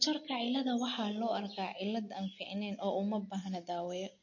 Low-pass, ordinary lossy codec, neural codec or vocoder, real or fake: 7.2 kHz; MP3, 32 kbps; none; real